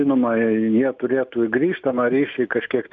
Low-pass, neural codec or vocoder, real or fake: 7.2 kHz; none; real